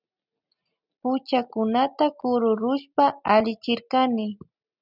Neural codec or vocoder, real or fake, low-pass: none; real; 5.4 kHz